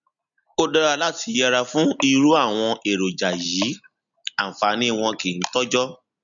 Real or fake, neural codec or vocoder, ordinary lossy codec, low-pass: real; none; none; 7.2 kHz